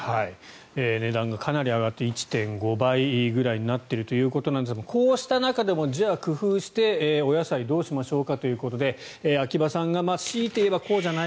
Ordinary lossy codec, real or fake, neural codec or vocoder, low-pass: none; real; none; none